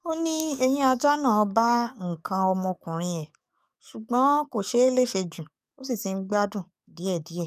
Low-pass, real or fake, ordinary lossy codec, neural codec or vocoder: 14.4 kHz; fake; none; codec, 44.1 kHz, 7.8 kbps, DAC